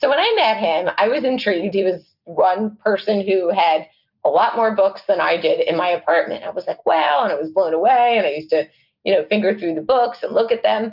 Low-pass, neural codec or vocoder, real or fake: 5.4 kHz; none; real